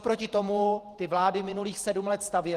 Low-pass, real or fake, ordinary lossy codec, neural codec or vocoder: 14.4 kHz; fake; Opus, 24 kbps; vocoder, 48 kHz, 128 mel bands, Vocos